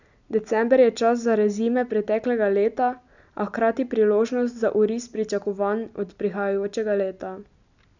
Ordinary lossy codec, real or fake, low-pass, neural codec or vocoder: none; real; 7.2 kHz; none